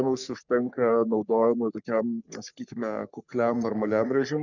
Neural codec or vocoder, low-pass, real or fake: codec, 44.1 kHz, 3.4 kbps, Pupu-Codec; 7.2 kHz; fake